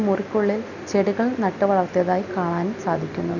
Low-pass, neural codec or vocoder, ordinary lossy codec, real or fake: 7.2 kHz; none; none; real